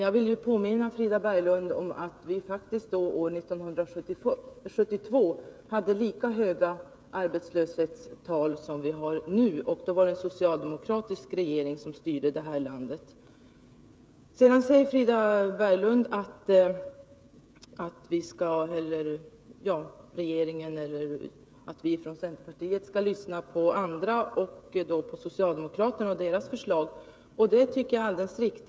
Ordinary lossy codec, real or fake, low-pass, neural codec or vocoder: none; fake; none; codec, 16 kHz, 16 kbps, FreqCodec, smaller model